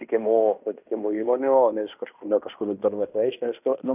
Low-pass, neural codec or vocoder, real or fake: 3.6 kHz; codec, 16 kHz in and 24 kHz out, 0.9 kbps, LongCat-Audio-Codec, fine tuned four codebook decoder; fake